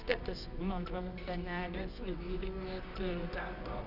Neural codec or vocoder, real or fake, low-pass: codec, 24 kHz, 0.9 kbps, WavTokenizer, medium music audio release; fake; 5.4 kHz